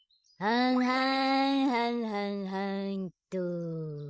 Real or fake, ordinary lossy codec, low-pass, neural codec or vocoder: fake; none; none; codec, 16 kHz, 16 kbps, FreqCodec, larger model